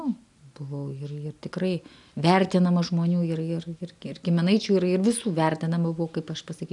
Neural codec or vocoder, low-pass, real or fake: none; 10.8 kHz; real